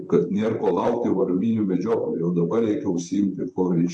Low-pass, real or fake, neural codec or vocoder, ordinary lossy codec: 9.9 kHz; fake; vocoder, 44.1 kHz, 128 mel bands, Pupu-Vocoder; AAC, 64 kbps